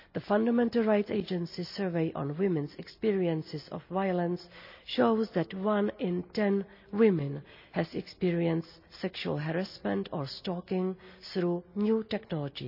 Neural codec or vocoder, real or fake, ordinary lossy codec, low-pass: none; real; none; 5.4 kHz